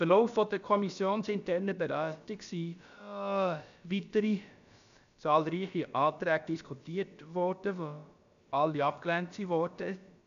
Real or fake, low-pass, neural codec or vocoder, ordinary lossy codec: fake; 7.2 kHz; codec, 16 kHz, about 1 kbps, DyCAST, with the encoder's durations; none